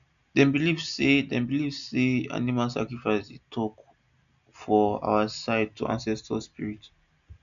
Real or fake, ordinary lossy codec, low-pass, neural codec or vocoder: real; none; 7.2 kHz; none